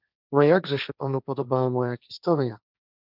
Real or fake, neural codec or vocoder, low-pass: fake; codec, 16 kHz, 1.1 kbps, Voila-Tokenizer; 5.4 kHz